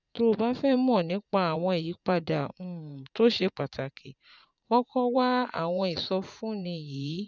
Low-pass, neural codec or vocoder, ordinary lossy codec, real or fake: 7.2 kHz; vocoder, 44.1 kHz, 80 mel bands, Vocos; none; fake